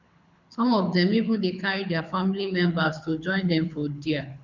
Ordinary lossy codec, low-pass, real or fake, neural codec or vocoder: none; 7.2 kHz; fake; codec, 24 kHz, 6 kbps, HILCodec